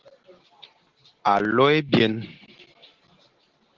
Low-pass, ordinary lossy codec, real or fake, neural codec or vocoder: 7.2 kHz; Opus, 16 kbps; real; none